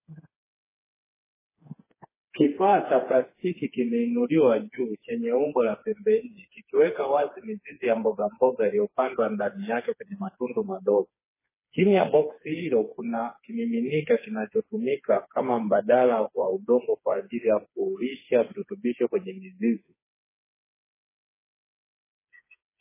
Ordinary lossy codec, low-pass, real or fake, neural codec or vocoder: MP3, 16 kbps; 3.6 kHz; fake; codec, 16 kHz, 4 kbps, FreqCodec, smaller model